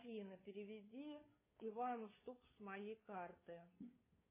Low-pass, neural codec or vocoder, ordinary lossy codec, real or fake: 3.6 kHz; codec, 16 kHz, 2 kbps, FunCodec, trained on Chinese and English, 25 frames a second; MP3, 16 kbps; fake